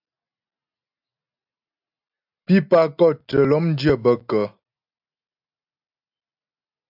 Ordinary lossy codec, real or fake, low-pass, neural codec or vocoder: AAC, 48 kbps; real; 5.4 kHz; none